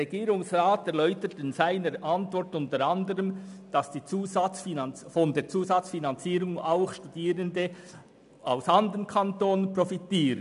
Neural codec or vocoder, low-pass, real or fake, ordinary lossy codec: none; 10.8 kHz; real; none